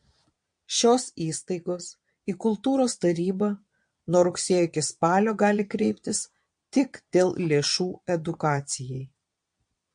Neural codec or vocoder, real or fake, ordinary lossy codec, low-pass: vocoder, 22.05 kHz, 80 mel bands, Vocos; fake; MP3, 48 kbps; 9.9 kHz